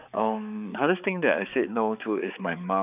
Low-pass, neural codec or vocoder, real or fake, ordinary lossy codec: 3.6 kHz; codec, 16 kHz, 4 kbps, X-Codec, HuBERT features, trained on balanced general audio; fake; none